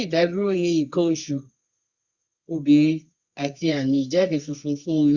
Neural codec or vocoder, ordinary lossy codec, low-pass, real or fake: codec, 24 kHz, 0.9 kbps, WavTokenizer, medium music audio release; Opus, 64 kbps; 7.2 kHz; fake